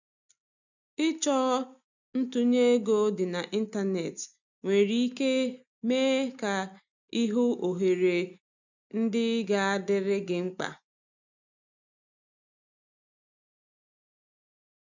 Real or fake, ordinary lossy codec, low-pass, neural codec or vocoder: real; none; 7.2 kHz; none